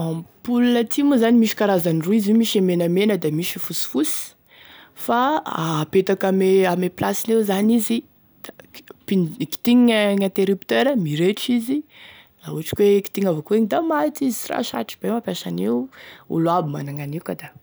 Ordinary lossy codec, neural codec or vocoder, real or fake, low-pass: none; none; real; none